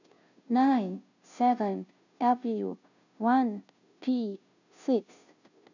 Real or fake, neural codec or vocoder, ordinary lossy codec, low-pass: fake; codec, 16 kHz, 0.5 kbps, FunCodec, trained on Chinese and English, 25 frames a second; none; 7.2 kHz